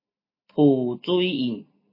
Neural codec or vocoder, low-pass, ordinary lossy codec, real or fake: none; 5.4 kHz; MP3, 24 kbps; real